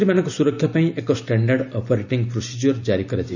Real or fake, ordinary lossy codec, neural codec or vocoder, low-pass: real; none; none; 7.2 kHz